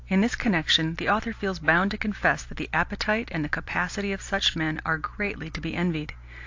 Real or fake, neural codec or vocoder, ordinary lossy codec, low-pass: real; none; AAC, 48 kbps; 7.2 kHz